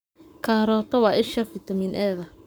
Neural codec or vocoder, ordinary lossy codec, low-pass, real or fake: codec, 44.1 kHz, 7.8 kbps, Pupu-Codec; none; none; fake